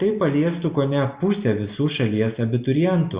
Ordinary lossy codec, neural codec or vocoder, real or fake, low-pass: Opus, 64 kbps; none; real; 3.6 kHz